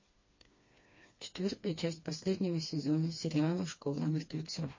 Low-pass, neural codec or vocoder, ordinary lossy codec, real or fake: 7.2 kHz; codec, 16 kHz, 2 kbps, FreqCodec, smaller model; MP3, 32 kbps; fake